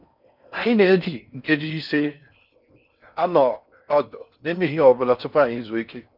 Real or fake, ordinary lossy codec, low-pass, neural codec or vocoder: fake; none; 5.4 kHz; codec, 16 kHz in and 24 kHz out, 0.6 kbps, FocalCodec, streaming, 4096 codes